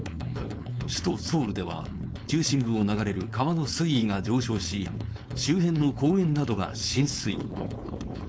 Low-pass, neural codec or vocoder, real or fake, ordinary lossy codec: none; codec, 16 kHz, 4.8 kbps, FACodec; fake; none